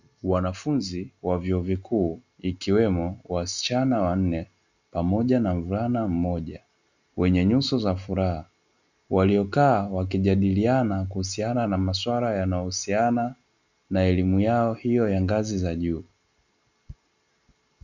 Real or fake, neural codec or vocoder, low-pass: real; none; 7.2 kHz